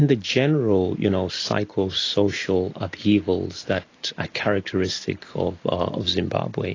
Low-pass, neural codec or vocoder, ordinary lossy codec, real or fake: 7.2 kHz; none; AAC, 32 kbps; real